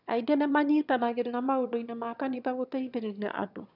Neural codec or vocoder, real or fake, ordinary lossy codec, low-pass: autoencoder, 22.05 kHz, a latent of 192 numbers a frame, VITS, trained on one speaker; fake; none; 5.4 kHz